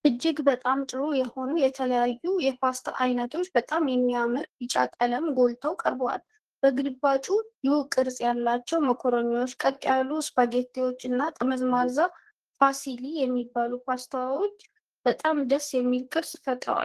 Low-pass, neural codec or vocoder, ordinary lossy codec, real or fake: 14.4 kHz; codec, 32 kHz, 1.9 kbps, SNAC; Opus, 16 kbps; fake